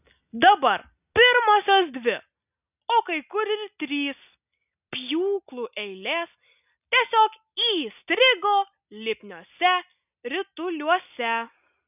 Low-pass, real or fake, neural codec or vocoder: 3.6 kHz; real; none